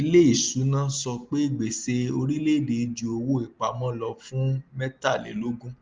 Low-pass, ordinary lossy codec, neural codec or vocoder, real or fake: 7.2 kHz; Opus, 32 kbps; none; real